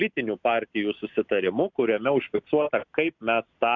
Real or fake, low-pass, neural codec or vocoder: real; 7.2 kHz; none